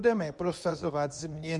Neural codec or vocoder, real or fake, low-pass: codec, 24 kHz, 0.9 kbps, WavTokenizer, medium speech release version 2; fake; 10.8 kHz